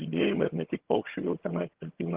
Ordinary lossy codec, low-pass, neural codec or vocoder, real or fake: Opus, 16 kbps; 3.6 kHz; vocoder, 22.05 kHz, 80 mel bands, HiFi-GAN; fake